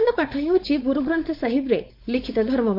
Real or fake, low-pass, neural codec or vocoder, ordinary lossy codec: fake; 5.4 kHz; codec, 16 kHz, 4.8 kbps, FACodec; MP3, 32 kbps